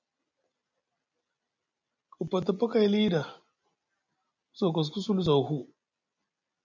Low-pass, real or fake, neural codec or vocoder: 7.2 kHz; real; none